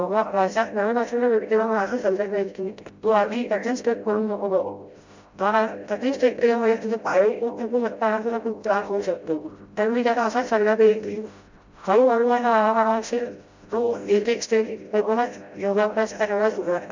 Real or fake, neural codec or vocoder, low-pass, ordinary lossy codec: fake; codec, 16 kHz, 0.5 kbps, FreqCodec, smaller model; 7.2 kHz; MP3, 64 kbps